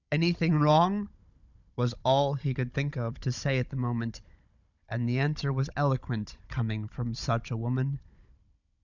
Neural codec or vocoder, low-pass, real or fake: codec, 16 kHz, 16 kbps, FunCodec, trained on Chinese and English, 50 frames a second; 7.2 kHz; fake